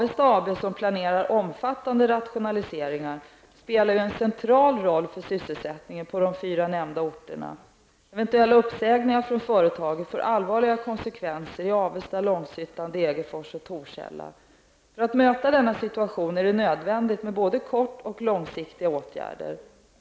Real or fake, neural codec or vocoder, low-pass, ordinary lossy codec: real; none; none; none